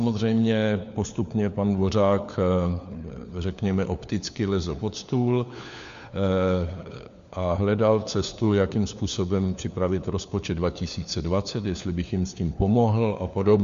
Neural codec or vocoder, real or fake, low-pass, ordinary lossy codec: codec, 16 kHz, 4 kbps, FunCodec, trained on LibriTTS, 50 frames a second; fake; 7.2 kHz; MP3, 64 kbps